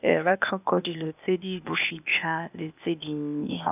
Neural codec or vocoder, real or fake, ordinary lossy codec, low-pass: codec, 16 kHz, 0.8 kbps, ZipCodec; fake; none; 3.6 kHz